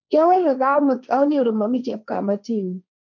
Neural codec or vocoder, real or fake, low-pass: codec, 16 kHz, 1.1 kbps, Voila-Tokenizer; fake; 7.2 kHz